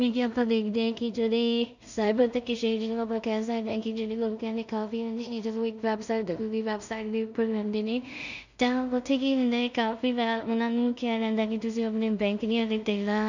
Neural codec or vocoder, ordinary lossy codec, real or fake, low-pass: codec, 16 kHz in and 24 kHz out, 0.4 kbps, LongCat-Audio-Codec, two codebook decoder; none; fake; 7.2 kHz